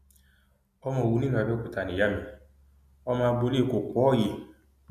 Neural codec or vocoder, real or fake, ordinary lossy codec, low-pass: none; real; none; 14.4 kHz